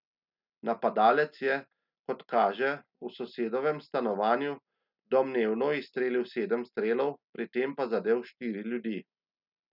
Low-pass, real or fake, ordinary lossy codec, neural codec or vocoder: 5.4 kHz; real; none; none